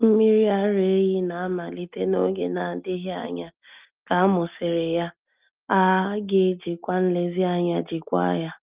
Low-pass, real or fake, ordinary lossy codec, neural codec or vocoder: 3.6 kHz; real; Opus, 32 kbps; none